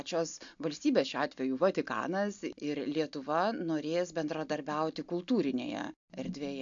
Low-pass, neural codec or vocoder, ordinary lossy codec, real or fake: 7.2 kHz; none; AAC, 64 kbps; real